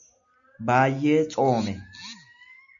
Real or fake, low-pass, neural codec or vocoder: real; 7.2 kHz; none